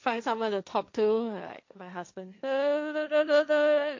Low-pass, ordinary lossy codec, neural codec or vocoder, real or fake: 7.2 kHz; MP3, 48 kbps; codec, 16 kHz, 1.1 kbps, Voila-Tokenizer; fake